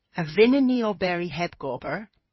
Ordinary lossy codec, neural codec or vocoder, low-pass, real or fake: MP3, 24 kbps; codec, 16 kHz in and 24 kHz out, 2.2 kbps, FireRedTTS-2 codec; 7.2 kHz; fake